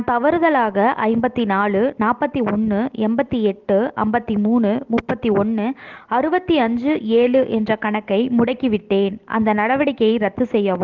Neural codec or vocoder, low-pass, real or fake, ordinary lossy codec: none; 7.2 kHz; real; Opus, 16 kbps